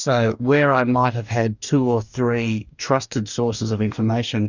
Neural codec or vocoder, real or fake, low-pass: codec, 44.1 kHz, 2.6 kbps, DAC; fake; 7.2 kHz